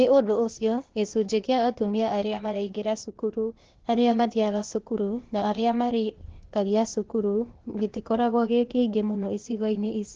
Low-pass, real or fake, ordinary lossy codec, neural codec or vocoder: 7.2 kHz; fake; Opus, 16 kbps; codec, 16 kHz, 0.8 kbps, ZipCodec